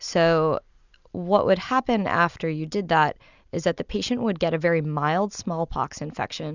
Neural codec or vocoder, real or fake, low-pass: none; real; 7.2 kHz